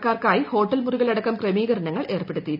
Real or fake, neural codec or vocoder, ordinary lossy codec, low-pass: real; none; none; 5.4 kHz